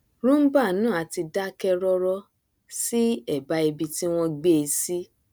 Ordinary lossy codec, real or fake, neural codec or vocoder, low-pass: none; real; none; none